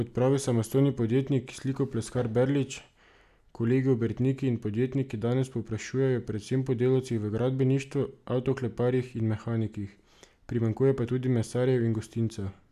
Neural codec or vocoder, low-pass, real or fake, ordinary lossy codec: none; 14.4 kHz; real; none